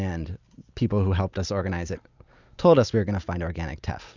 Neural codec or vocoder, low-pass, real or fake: none; 7.2 kHz; real